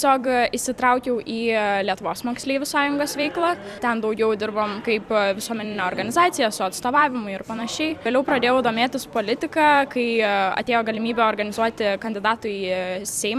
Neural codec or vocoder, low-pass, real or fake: vocoder, 44.1 kHz, 128 mel bands every 256 samples, BigVGAN v2; 14.4 kHz; fake